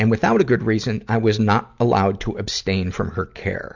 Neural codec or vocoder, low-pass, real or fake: none; 7.2 kHz; real